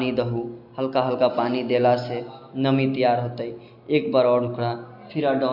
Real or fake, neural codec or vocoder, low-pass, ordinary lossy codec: real; none; 5.4 kHz; none